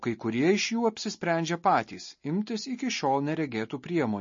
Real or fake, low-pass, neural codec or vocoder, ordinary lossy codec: real; 7.2 kHz; none; MP3, 32 kbps